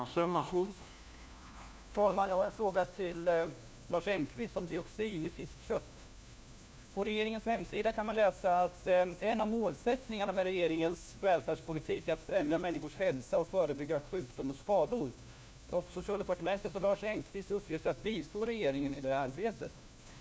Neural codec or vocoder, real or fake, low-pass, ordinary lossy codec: codec, 16 kHz, 1 kbps, FunCodec, trained on LibriTTS, 50 frames a second; fake; none; none